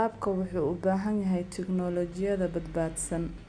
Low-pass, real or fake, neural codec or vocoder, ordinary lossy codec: 9.9 kHz; real; none; Opus, 64 kbps